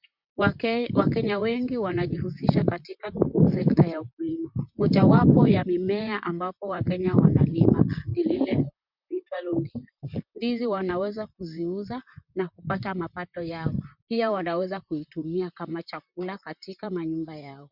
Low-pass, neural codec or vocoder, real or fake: 5.4 kHz; vocoder, 44.1 kHz, 128 mel bands, Pupu-Vocoder; fake